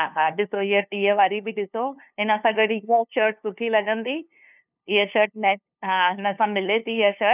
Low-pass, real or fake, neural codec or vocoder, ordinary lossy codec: 3.6 kHz; fake; codec, 16 kHz, 2 kbps, FunCodec, trained on LibriTTS, 25 frames a second; none